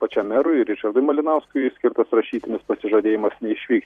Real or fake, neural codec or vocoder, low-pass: fake; vocoder, 44.1 kHz, 128 mel bands every 256 samples, BigVGAN v2; 14.4 kHz